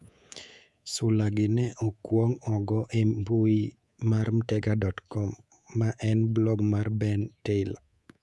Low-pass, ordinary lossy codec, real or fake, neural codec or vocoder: none; none; fake; codec, 24 kHz, 3.1 kbps, DualCodec